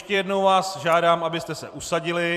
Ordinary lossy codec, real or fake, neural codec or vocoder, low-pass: AAC, 96 kbps; fake; vocoder, 44.1 kHz, 128 mel bands every 256 samples, BigVGAN v2; 14.4 kHz